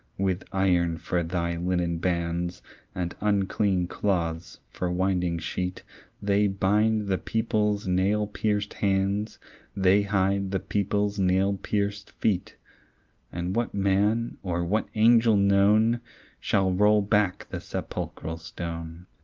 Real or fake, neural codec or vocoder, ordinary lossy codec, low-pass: real; none; Opus, 32 kbps; 7.2 kHz